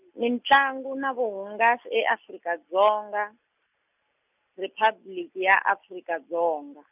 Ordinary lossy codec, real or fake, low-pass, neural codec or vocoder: none; real; 3.6 kHz; none